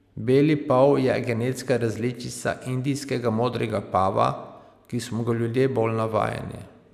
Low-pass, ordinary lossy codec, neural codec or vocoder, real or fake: 14.4 kHz; none; none; real